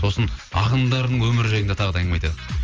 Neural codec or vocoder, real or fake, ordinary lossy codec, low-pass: none; real; Opus, 24 kbps; 7.2 kHz